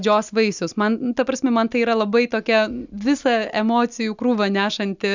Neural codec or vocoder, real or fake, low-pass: none; real; 7.2 kHz